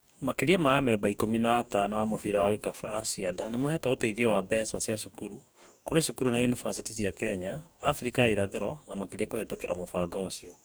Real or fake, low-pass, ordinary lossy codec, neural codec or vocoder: fake; none; none; codec, 44.1 kHz, 2.6 kbps, DAC